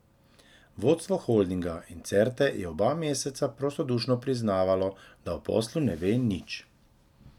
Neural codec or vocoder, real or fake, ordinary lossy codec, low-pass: none; real; none; 19.8 kHz